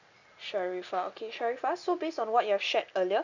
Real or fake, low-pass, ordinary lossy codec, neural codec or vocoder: real; 7.2 kHz; none; none